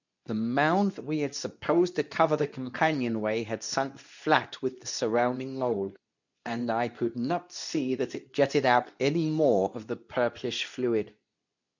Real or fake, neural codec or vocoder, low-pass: fake; codec, 24 kHz, 0.9 kbps, WavTokenizer, medium speech release version 2; 7.2 kHz